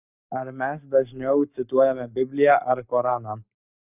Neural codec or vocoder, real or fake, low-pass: codec, 44.1 kHz, 7.8 kbps, Pupu-Codec; fake; 3.6 kHz